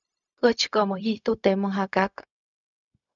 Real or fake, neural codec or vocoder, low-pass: fake; codec, 16 kHz, 0.4 kbps, LongCat-Audio-Codec; 7.2 kHz